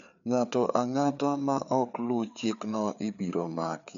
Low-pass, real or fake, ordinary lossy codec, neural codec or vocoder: 7.2 kHz; fake; none; codec, 16 kHz, 4 kbps, FreqCodec, larger model